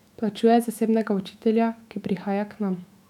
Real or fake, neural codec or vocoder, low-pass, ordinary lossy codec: fake; autoencoder, 48 kHz, 128 numbers a frame, DAC-VAE, trained on Japanese speech; 19.8 kHz; none